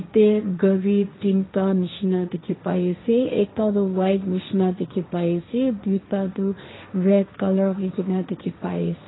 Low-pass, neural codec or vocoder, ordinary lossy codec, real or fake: 7.2 kHz; codec, 16 kHz, 1.1 kbps, Voila-Tokenizer; AAC, 16 kbps; fake